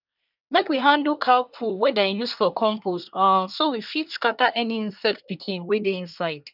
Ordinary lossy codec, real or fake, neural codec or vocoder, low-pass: none; fake; codec, 24 kHz, 1 kbps, SNAC; 5.4 kHz